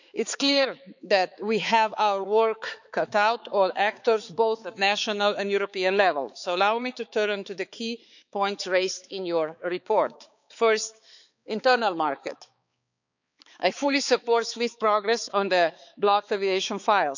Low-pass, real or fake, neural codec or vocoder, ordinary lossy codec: 7.2 kHz; fake; codec, 16 kHz, 4 kbps, X-Codec, HuBERT features, trained on balanced general audio; none